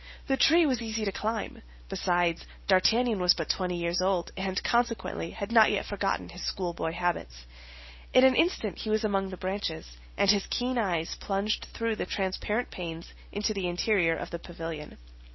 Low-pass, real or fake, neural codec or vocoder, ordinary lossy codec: 7.2 kHz; real; none; MP3, 24 kbps